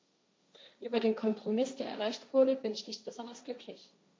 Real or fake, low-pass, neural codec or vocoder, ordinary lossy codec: fake; none; codec, 16 kHz, 1.1 kbps, Voila-Tokenizer; none